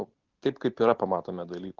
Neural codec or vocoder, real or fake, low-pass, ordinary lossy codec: none; real; 7.2 kHz; Opus, 24 kbps